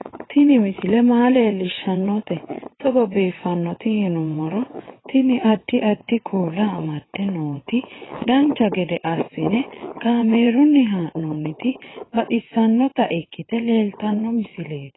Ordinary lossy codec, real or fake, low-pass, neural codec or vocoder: AAC, 16 kbps; fake; 7.2 kHz; vocoder, 22.05 kHz, 80 mel bands, WaveNeXt